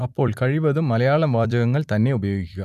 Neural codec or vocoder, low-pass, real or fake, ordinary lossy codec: vocoder, 44.1 kHz, 128 mel bands every 256 samples, BigVGAN v2; 14.4 kHz; fake; none